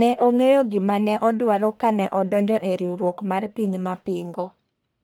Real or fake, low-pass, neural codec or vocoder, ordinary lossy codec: fake; none; codec, 44.1 kHz, 1.7 kbps, Pupu-Codec; none